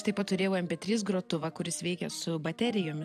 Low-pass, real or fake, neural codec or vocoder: 14.4 kHz; real; none